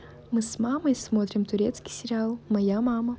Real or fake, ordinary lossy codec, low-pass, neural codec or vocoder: real; none; none; none